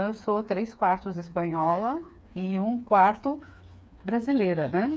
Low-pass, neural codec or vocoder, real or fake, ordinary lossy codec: none; codec, 16 kHz, 4 kbps, FreqCodec, smaller model; fake; none